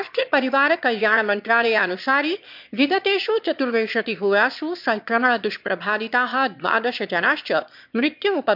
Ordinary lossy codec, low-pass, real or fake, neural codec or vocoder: MP3, 48 kbps; 5.4 kHz; fake; autoencoder, 22.05 kHz, a latent of 192 numbers a frame, VITS, trained on one speaker